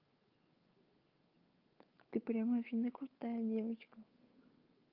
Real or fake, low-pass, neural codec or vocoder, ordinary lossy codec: fake; 5.4 kHz; codec, 16 kHz, 8 kbps, FunCodec, trained on Chinese and English, 25 frames a second; Opus, 24 kbps